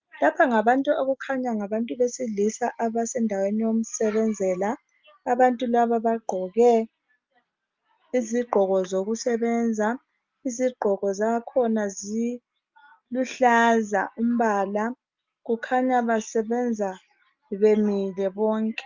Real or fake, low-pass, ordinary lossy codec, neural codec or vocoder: real; 7.2 kHz; Opus, 32 kbps; none